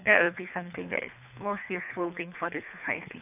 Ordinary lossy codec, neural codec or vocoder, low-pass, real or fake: none; codec, 24 kHz, 3 kbps, HILCodec; 3.6 kHz; fake